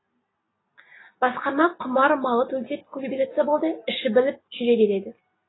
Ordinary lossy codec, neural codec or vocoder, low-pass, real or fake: AAC, 16 kbps; none; 7.2 kHz; real